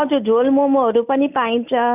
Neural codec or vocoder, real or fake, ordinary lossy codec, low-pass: none; real; none; 3.6 kHz